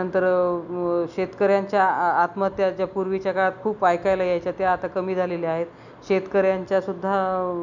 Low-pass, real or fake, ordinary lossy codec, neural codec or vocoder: 7.2 kHz; real; none; none